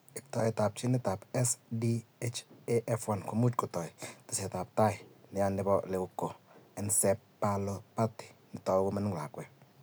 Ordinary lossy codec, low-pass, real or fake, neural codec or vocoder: none; none; real; none